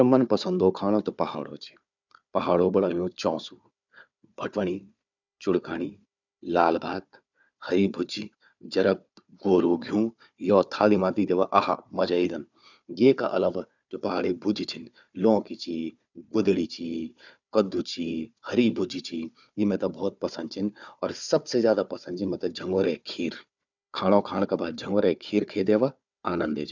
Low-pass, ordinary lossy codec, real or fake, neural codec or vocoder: 7.2 kHz; none; fake; codec, 16 kHz, 4 kbps, FunCodec, trained on Chinese and English, 50 frames a second